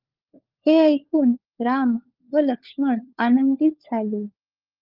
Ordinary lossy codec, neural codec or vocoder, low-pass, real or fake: Opus, 24 kbps; codec, 16 kHz, 4 kbps, FunCodec, trained on LibriTTS, 50 frames a second; 5.4 kHz; fake